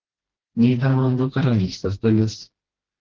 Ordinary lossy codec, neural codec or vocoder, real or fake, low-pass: Opus, 16 kbps; codec, 16 kHz, 1 kbps, FreqCodec, smaller model; fake; 7.2 kHz